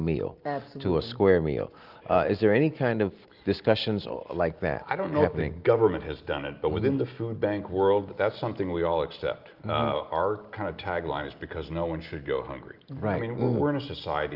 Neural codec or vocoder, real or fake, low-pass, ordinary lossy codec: none; real; 5.4 kHz; Opus, 24 kbps